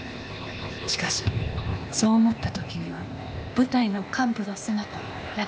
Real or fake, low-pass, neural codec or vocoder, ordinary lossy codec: fake; none; codec, 16 kHz, 0.8 kbps, ZipCodec; none